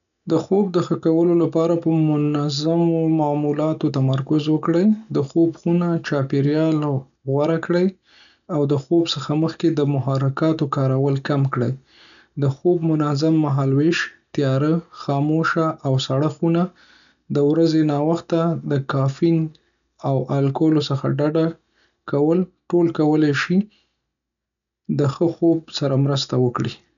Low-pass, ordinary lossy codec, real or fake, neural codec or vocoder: 7.2 kHz; none; real; none